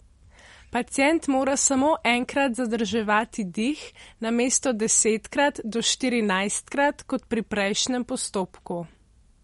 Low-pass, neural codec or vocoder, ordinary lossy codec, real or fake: 19.8 kHz; none; MP3, 48 kbps; real